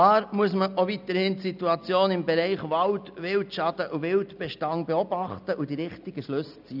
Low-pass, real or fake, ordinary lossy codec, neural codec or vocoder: 5.4 kHz; real; none; none